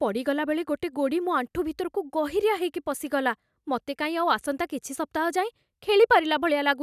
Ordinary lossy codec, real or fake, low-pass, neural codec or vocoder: none; real; 14.4 kHz; none